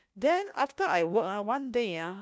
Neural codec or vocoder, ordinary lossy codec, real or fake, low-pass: codec, 16 kHz, 1 kbps, FunCodec, trained on LibriTTS, 50 frames a second; none; fake; none